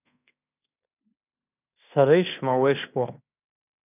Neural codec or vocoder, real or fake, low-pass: codec, 16 kHz in and 24 kHz out, 0.9 kbps, LongCat-Audio-Codec, fine tuned four codebook decoder; fake; 3.6 kHz